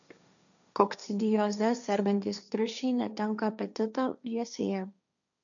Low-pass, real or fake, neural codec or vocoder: 7.2 kHz; fake; codec, 16 kHz, 1.1 kbps, Voila-Tokenizer